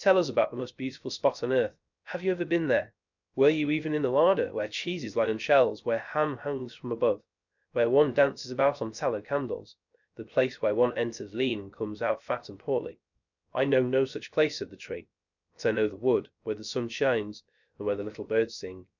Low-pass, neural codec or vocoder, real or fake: 7.2 kHz; codec, 16 kHz, 0.3 kbps, FocalCodec; fake